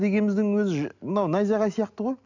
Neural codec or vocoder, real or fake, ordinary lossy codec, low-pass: none; real; none; 7.2 kHz